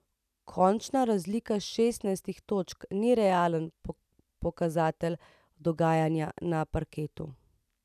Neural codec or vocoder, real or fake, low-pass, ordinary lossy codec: none; real; 14.4 kHz; none